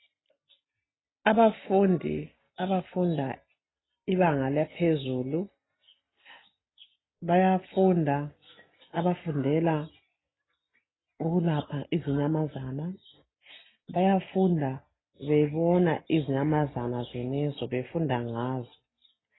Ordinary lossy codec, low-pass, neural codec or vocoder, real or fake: AAC, 16 kbps; 7.2 kHz; none; real